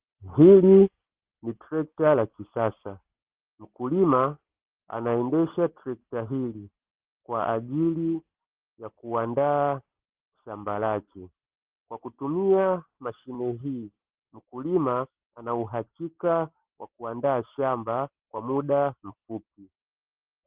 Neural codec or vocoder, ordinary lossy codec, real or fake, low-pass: none; Opus, 16 kbps; real; 3.6 kHz